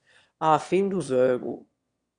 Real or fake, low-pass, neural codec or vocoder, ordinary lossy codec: fake; 9.9 kHz; autoencoder, 22.05 kHz, a latent of 192 numbers a frame, VITS, trained on one speaker; Opus, 64 kbps